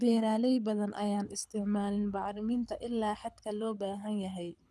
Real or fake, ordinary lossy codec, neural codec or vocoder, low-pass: fake; none; codec, 24 kHz, 6 kbps, HILCodec; none